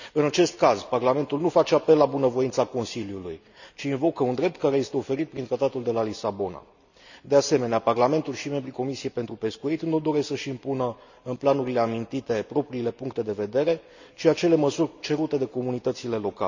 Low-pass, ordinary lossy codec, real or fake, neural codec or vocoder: 7.2 kHz; none; real; none